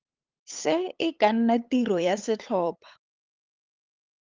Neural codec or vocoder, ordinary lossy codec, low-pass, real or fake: codec, 16 kHz, 8 kbps, FunCodec, trained on LibriTTS, 25 frames a second; Opus, 24 kbps; 7.2 kHz; fake